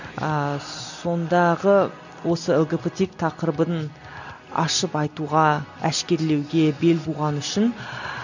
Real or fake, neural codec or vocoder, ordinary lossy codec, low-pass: real; none; none; 7.2 kHz